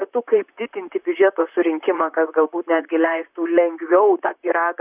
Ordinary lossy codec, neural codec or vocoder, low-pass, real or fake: Opus, 64 kbps; vocoder, 44.1 kHz, 128 mel bands, Pupu-Vocoder; 3.6 kHz; fake